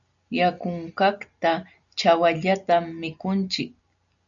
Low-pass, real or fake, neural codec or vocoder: 7.2 kHz; real; none